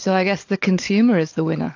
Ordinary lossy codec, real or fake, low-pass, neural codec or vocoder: AAC, 48 kbps; fake; 7.2 kHz; codec, 16 kHz, 16 kbps, FunCodec, trained on LibriTTS, 50 frames a second